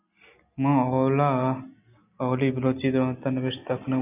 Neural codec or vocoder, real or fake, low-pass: none; real; 3.6 kHz